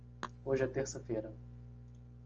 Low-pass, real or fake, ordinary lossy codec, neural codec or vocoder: 7.2 kHz; real; Opus, 24 kbps; none